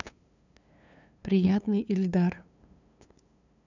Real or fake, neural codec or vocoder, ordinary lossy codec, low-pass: fake; codec, 16 kHz, 2 kbps, FunCodec, trained on LibriTTS, 25 frames a second; none; 7.2 kHz